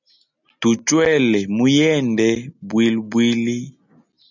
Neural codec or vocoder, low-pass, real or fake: none; 7.2 kHz; real